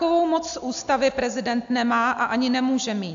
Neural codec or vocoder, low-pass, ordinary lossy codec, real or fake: none; 7.2 kHz; AAC, 64 kbps; real